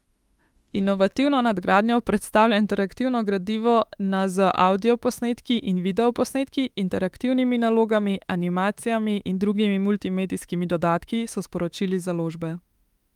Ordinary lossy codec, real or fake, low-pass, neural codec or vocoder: Opus, 32 kbps; fake; 19.8 kHz; autoencoder, 48 kHz, 32 numbers a frame, DAC-VAE, trained on Japanese speech